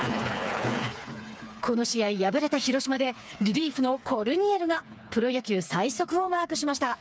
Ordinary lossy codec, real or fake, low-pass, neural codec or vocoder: none; fake; none; codec, 16 kHz, 4 kbps, FreqCodec, smaller model